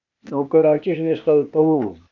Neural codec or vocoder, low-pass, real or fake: codec, 16 kHz, 0.8 kbps, ZipCodec; 7.2 kHz; fake